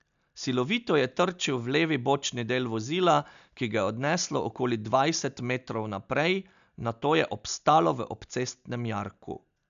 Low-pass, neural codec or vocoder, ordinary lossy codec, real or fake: 7.2 kHz; none; none; real